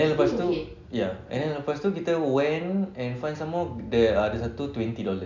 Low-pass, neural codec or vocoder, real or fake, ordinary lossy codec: 7.2 kHz; none; real; none